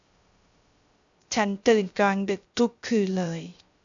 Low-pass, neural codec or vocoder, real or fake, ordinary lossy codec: 7.2 kHz; codec, 16 kHz, 0.3 kbps, FocalCodec; fake; MP3, 64 kbps